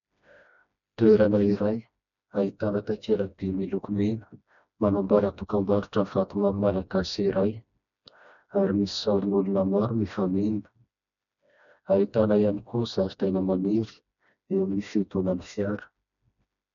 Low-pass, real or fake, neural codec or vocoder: 7.2 kHz; fake; codec, 16 kHz, 1 kbps, FreqCodec, smaller model